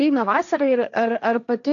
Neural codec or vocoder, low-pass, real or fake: codec, 16 kHz, 1.1 kbps, Voila-Tokenizer; 7.2 kHz; fake